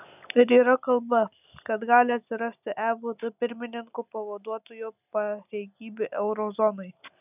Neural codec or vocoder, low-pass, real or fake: none; 3.6 kHz; real